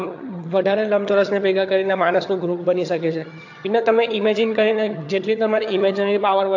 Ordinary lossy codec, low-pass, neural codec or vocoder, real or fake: AAC, 48 kbps; 7.2 kHz; vocoder, 22.05 kHz, 80 mel bands, HiFi-GAN; fake